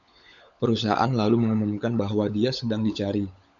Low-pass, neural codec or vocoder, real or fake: 7.2 kHz; codec, 16 kHz, 16 kbps, FunCodec, trained on LibriTTS, 50 frames a second; fake